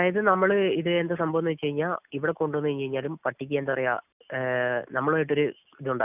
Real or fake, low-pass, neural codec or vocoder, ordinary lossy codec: real; 3.6 kHz; none; none